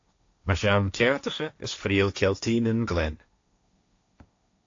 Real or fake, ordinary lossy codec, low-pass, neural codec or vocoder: fake; AAC, 48 kbps; 7.2 kHz; codec, 16 kHz, 1.1 kbps, Voila-Tokenizer